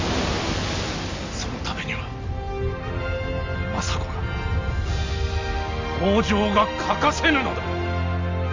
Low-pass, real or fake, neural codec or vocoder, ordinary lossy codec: 7.2 kHz; real; none; MP3, 48 kbps